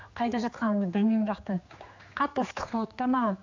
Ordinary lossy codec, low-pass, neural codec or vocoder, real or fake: AAC, 48 kbps; 7.2 kHz; codec, 16 kHz, 2 kbps, X-Codec, HuBERT features, trained on general audio; fake